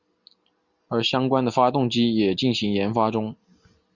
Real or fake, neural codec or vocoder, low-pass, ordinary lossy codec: real; none; 7.2 kHz; Opus, 64 kbps